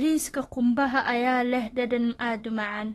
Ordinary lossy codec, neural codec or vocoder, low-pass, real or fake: AAC, 32 kbps; autoencoder, 48 kHz, 32 numbers a frame, DAC-VAE, trained on Japanese speech; 19.8 kHz; fake